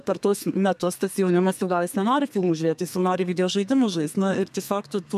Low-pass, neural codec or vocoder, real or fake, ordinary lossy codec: 14.4 kHz; codec, 32 kHz, 1.9 kbps, SNAC; fake; MP3, 96 kbps